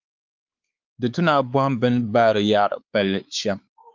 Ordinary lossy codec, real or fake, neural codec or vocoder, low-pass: Opus, 24 kbps; fake; codec, 16 kHz, 4 kbps, X-Codec, WavLM features, trained on Multilingual LibriSpeech; 7.2 kHz